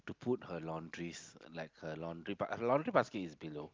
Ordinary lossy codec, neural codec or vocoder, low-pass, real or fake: Opus, 32 kbps; none; 7.2 kHz; real